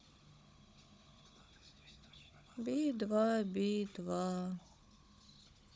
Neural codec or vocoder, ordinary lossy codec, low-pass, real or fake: codec, 16 kHz, 16 kbps, FunCodec, trained on Chinese and English, 50 frames a second; none; none; fake